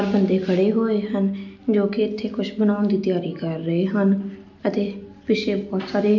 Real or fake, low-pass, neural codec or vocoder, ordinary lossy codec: real; 7.2 kHz; none; none